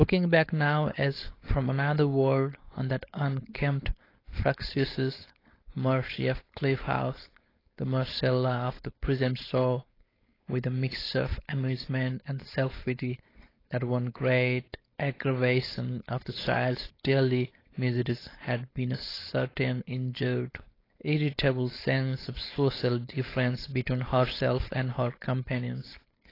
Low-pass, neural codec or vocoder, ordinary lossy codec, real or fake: 5.4 kHz; codec, 16 kHz, 4.8 kbps, FACodec; AAC, 24 kbps; fake